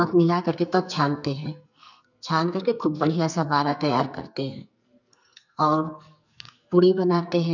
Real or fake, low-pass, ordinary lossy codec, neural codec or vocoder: fake; 7.2 kHz; none; codec, 44.1 kHz, 2.6 kbps, SNAC